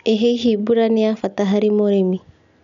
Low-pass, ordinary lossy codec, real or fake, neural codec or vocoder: 7.2 kHz; none; real; none